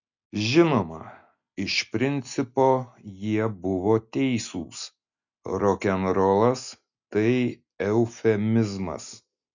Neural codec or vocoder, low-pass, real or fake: none; 7.2 kHz; real